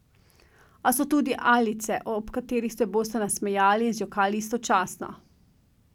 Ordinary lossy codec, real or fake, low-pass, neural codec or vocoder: none; real; 19.8 kHz; none